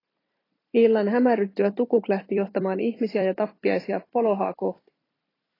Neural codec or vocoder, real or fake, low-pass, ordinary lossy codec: vocoder, 44.1 kHz, 128 mel bands every 256 samples, BigVGAN v2; fake; 5.4 kHz; AAC, 24 kbps